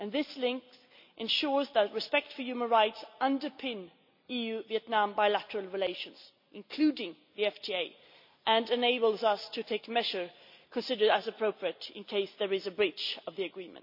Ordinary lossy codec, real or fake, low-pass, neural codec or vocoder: MP3, 48 kbps; real; 5.4 kHz; none